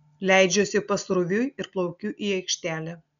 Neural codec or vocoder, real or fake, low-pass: none; real; 7.2 kHz